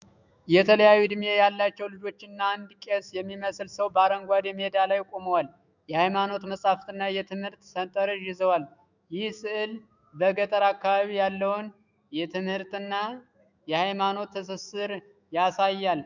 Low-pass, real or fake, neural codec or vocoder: 7.2 kHz; fake; autoencoder, 48 kHz, 128 numbers a frame, DAC-VAE, trained on Japanese speech